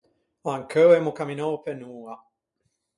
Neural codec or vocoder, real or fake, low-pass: none; real; 10.8 kHz